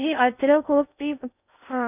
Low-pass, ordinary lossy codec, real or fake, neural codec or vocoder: 3.6 kHz; none; fake; codec, 16 kHz in and 24 kHz out, 0.6 kbps, FocalCodec, streaming, 4096 codes